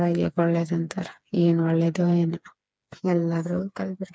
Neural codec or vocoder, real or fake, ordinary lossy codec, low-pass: codec, 16 kHz, 4 kbps, FreqCodec, smaller model; fake; none; none